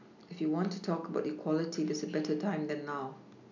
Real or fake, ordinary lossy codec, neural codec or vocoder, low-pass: real; none; none; 7.2 kHz